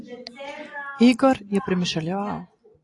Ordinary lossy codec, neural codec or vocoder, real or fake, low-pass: AAC, 48 kbps; none; real; 10.8 kHz